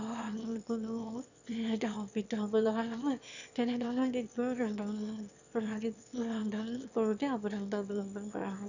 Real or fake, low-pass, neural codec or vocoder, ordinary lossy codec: fake; 7.2 kHz; autoencoder, 22.05 kHz, a latent of 192 numbers a frame, VITS, trained on one speaker; none